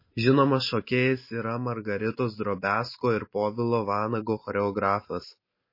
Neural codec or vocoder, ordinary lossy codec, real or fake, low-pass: none; MP3, 24 kbps; real; 5.4 kHz